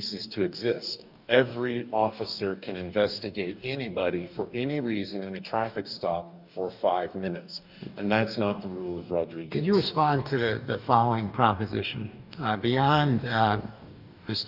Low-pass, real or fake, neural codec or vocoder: 5.4 kHz; fake; codec, 44.1 kHz, 2.6 kbps, DAC